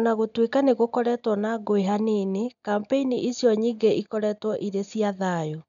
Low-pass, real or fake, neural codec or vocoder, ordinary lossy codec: 7.2 kHz; real; none; none